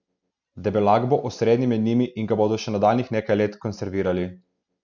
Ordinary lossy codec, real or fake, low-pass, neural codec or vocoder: none; real; 7.2 kHz; none